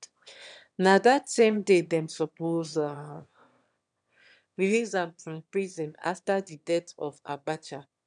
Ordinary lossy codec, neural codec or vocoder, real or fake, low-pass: none; autoencoder, 22.05 kHz, a latent of 192 numbers a frame, VITS, trained on one speaker; fake; 9.9 kHz